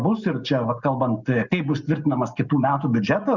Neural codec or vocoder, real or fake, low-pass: none; real; 7.2 kHz